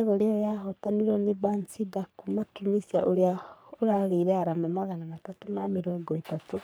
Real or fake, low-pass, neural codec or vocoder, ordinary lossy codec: fake; none; codec, 44.1 kHz, 3.4 kbps, Pupu-Codec; none